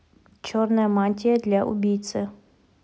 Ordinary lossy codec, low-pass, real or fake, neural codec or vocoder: none; none; real; none